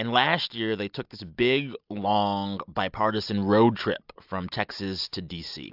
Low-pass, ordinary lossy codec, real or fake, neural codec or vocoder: 5.4 kHz; AAC, 48 kbps; real; none